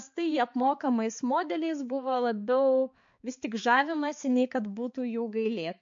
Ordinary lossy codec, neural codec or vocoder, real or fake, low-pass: MP3, 48 kbps; codec, 16 kHz, 4 kbps, X-Codec, HuBERT features, trained on balanced general audio; fake; 7.2 kHz